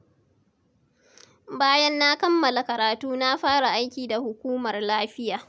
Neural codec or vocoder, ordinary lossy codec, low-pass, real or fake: none; none; none; real